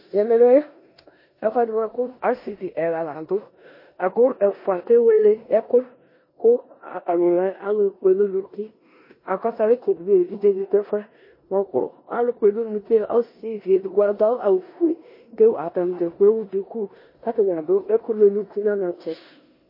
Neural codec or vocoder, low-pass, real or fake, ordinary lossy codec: codec, 16 kHz in and 24 kHz out, 0.9 kbps, LongCat-Audio-Codec, four codebook decoder; 5.4 kHz; fake; MP3, 24 kbps